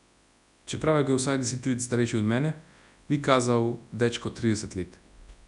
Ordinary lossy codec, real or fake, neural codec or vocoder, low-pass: none; fake; codec, 24 kHz, 0.9 kbps, WavTokenizer, large speech release; 10.8 kHz